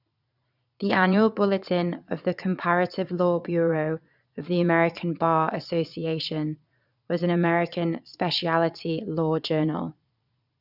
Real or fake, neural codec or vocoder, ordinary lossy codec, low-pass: fake; vocoder, 22.05 kHz, 80 mel bands, Vocos; none; 5.4 kHz